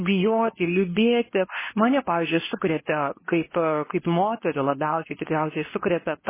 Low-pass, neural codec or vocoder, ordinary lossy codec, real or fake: 3.6 kHz; codec, 24 kHz, 0.9 kbps, WavTokenizer, medium speech release version 2; MP3, 16 kbps; fake